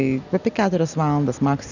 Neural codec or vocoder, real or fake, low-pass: none; real; 7.2 kHz